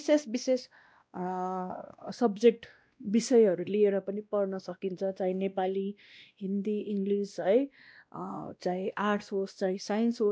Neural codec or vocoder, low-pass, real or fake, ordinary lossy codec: codec, 16 kHz, 1 kbps, X-Codec, WavLM features, trained on Multilingual LibriSpeech; none; fake; none